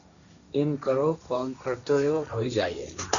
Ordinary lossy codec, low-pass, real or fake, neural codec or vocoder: MP3, 96 kbps; 7.2 kHz; fake; codec, 16 kHz, 1.1 kbps, Voila-Tokenizer